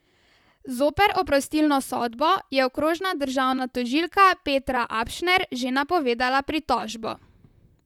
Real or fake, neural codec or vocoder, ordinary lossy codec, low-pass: fake; vocoder, 44.1 kHz, 128 mel bands, Pupu-Vocoder; none; 19.8 kHz